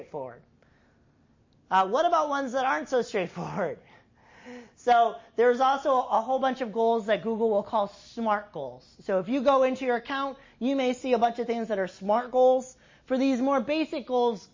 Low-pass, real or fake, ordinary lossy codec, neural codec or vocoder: 7.2 kHz; real; MP3, 32 kbps; none